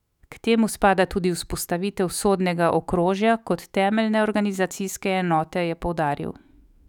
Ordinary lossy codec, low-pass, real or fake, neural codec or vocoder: none; 19.8 kHz; fake; autoencoder, 48 kHz, 128 numbers a frame, DAC-VAE, trained on Japanese speech